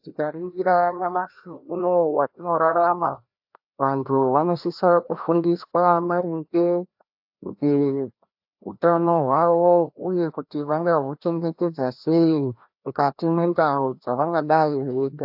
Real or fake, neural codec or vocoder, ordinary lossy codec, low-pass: fake; codec, 16 kHz, 1 kbps, FreqCodec, larger model; AAC, 48 kbps; 5.4 kHz